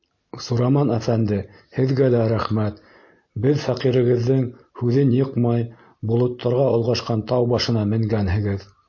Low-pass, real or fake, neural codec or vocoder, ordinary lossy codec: 7.2 kHz; real; none; MP3, 32 kbps